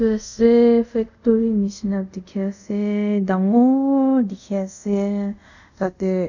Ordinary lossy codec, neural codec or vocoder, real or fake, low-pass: none; codec, 24 kHz, 0.5 kbps, DualCodec; fake; 7.2 kHz